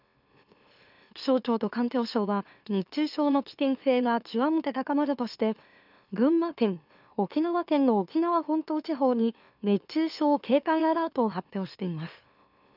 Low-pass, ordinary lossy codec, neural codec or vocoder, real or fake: 5.4 kHz; none; autoencoder, 44.1 kHz, a latent of 192 numbers a frame, MeloTTS; fake